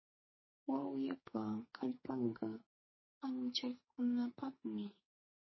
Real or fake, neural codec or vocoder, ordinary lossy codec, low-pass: fake; codec, 44.1 kHz, 2.6 kbps, SNAC; MP3, 24 kbps; 7.2 kHz